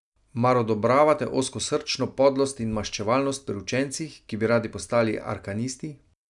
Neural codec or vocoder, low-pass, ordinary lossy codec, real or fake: none; 10.8 kHz; none; real